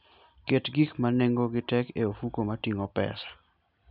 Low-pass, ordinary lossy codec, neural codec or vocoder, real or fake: 5.4 kHz; none; none; real